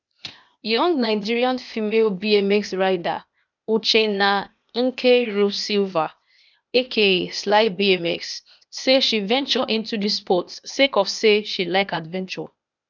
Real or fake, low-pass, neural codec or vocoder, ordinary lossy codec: fake; 7.2 kHz; codec, 16 kHz, 0.8 kbps, ZipCodec; none